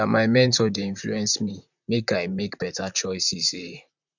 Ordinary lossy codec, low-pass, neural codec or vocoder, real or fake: none; 7.2 kHz; vocoder, 44.1 kHz, 128 mel bands, Pupu-Vocoder; fake